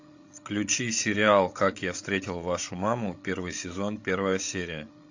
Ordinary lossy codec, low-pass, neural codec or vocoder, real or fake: AAC, 48 kbps; 7.2 kHz; codec, 16 kHz, 16 kbps, FreqCodec, larger model; fake